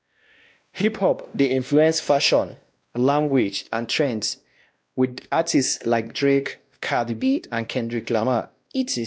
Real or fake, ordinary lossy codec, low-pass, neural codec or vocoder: fake; none; none; codec, 16 kHz, 1 kbps, X-Codec, WavLM features, trained on Multilingual LibriSpeech